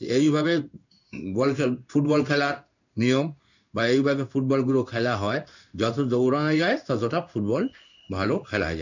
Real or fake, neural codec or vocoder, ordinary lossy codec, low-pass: fake; codec, 16 kHz in and 24 kHz out, 1 kbps, XY-Tokenizer; none; 7.2 kHz